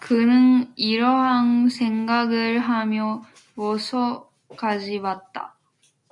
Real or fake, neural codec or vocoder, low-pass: real; none; 10.8 kHz